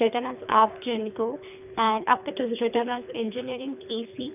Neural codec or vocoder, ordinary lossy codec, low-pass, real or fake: codec, 24 kHz, 3 kbps, HILCodec; none; 3.6 kHz; fake